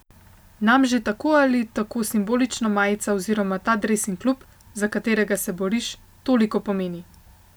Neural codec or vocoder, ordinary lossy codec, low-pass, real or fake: none; none; none; real